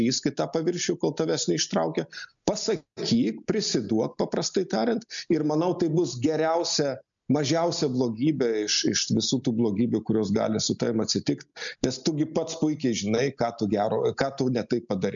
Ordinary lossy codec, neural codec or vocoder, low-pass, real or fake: MP3, 96 kbps; none; 7.2 kHz; real